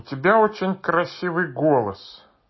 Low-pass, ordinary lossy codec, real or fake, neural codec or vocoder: 7.2 kHz; MP3, 24 kbps; real; none